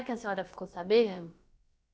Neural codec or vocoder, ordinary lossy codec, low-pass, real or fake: codec, 16 kHz, about 1 kbps, DyCAST, with the encoder's durations; none; none; fake